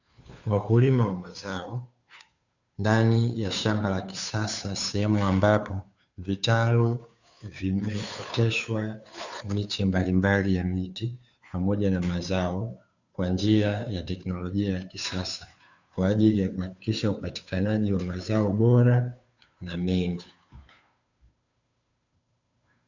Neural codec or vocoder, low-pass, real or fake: codec, 16 kHz, 2 kbps, FunCodec, trained on Chinese and English, 25 frames a second; 7.2 kHz; fake